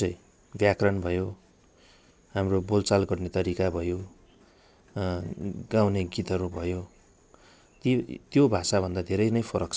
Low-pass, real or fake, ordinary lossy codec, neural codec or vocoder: none; real; none; none